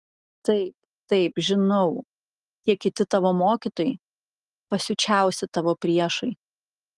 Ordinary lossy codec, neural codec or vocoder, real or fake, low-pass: Opus, 24 kbps; none; real; 10.8 kHz